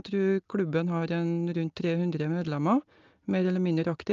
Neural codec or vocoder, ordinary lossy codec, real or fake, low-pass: none; Opus, 32 kbps; real; 7.2 kHz